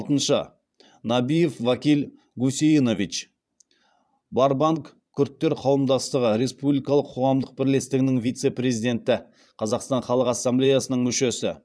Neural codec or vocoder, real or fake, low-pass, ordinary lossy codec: vocoder, 22.05 kHz, 80 mel bands, Vocos; fake; none; none